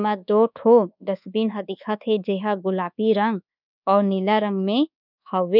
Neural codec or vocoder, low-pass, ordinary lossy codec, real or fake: codec, 24 kHz, 1.2 kbps, DualCodec; 5.4 kHz; none; fake